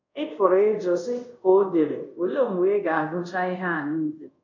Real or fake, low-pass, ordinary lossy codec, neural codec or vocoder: fake; 7.2 kHz; MP3, 64 kbps; codec, 24 kHz, 0.5 kbps, DualCodec